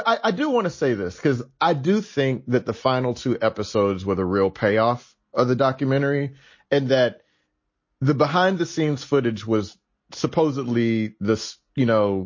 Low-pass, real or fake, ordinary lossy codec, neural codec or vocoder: 7.2 kHz; real; MP3, 32 kbps; none